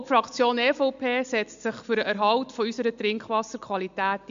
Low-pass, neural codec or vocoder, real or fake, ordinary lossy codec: 7.2 kHz; none; real; MP3, 64 kbps